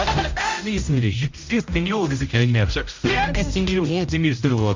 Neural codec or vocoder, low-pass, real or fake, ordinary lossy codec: codec, 16 kHz, 0.5 kbps, X-Codec, HuBERT features, trained on general audio; 7.2 kHz; fake; MP3, 64 kbps